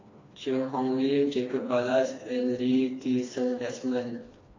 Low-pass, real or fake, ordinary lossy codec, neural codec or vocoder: 7.2 kHz; fake; AAC, 32 kbps; codec, 16 kHz, 2 kbps, FreqCodec, smaller model